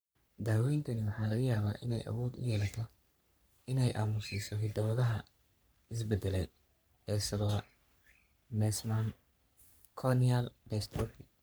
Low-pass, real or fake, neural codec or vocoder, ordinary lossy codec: none; fake; codec, 44.1 kHz, 3.4 kbps, Pupu-Codec; none